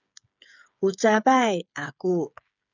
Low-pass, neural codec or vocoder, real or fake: 7.2 kHz; codec, 16 kHz, 8 kbps, FreqCodec, smaller model; fake